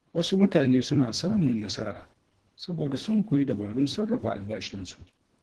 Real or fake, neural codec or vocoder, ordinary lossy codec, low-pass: fake; codec, 24 kHz, 1.5 kbps, HILCodec; Opus, 16 kbps; 10.8 kHz